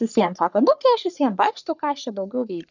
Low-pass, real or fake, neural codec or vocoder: 7.2 kHz; fake; codec, 44.1 kHz, 3.4 kbps, Pupu-Codec